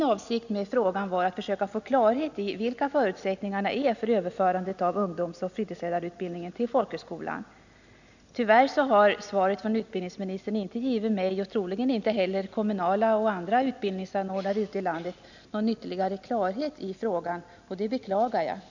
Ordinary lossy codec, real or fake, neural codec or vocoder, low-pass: none; real; none; 7.2 kHz